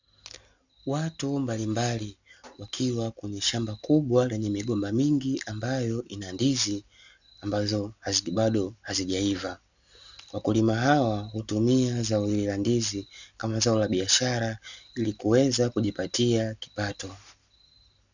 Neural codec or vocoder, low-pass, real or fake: none; 7.2 kHz; real